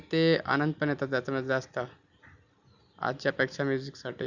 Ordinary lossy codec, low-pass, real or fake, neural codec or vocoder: none; 7.2 kHz; real; none